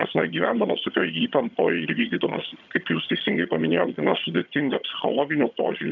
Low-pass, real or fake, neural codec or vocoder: 7.2 kHz; fake; vocoder, 22.05 kHz, 80 mel bands, HiFi-GAN